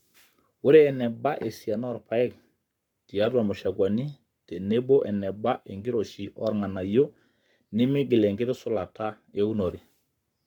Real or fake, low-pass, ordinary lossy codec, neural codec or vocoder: fake; 19.8 kHz; none; codec, 44.1 kHz, 7.8 kbps, Pupu-Codec